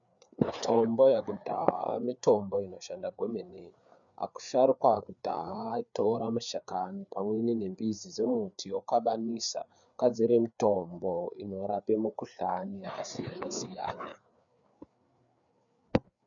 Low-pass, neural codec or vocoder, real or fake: 7.2 kHz; codec, 16 kHz, 4 kbps, FreqCodec, larger model; fake